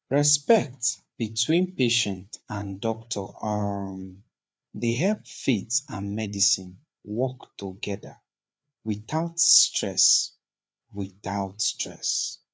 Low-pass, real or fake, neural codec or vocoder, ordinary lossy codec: none; fake; codec, 16 kHz, 4 kbps, FreqCodec, larger model; none